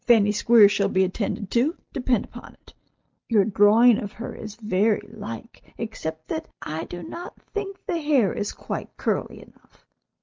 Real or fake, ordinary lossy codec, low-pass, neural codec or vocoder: real; Opus, 24 kbps; 7.2 kHz; none